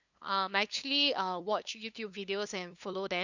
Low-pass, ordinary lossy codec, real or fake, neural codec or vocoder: 7.2 kHz; none; fake; codec, 16 kHz, 2 kbps, FunCodec, trained on LibriTTS, 25 frames a second